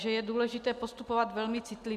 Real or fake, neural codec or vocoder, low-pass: real; none; 14.4 kHz